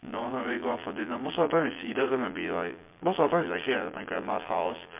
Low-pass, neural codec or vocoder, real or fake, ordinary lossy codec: 3.6 kHz; vocoder, 22.05 kHz, 80 mel bands, Vocos; fake; MP3, 32 kbps